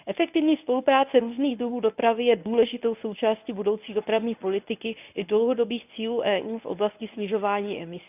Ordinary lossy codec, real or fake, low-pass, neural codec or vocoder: none; fake; 3.6 kHz; codec, 24 kHz, 0.9 kbps, WavTokenizer, medium speech release version 1